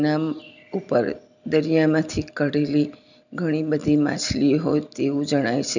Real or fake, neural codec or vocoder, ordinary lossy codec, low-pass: real; none; none; 7.2 kHz